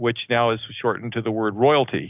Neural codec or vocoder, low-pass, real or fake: none; 3.6 kHz; real